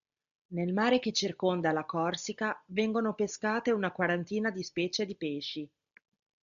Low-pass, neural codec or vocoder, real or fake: 7.2 kHz; none; real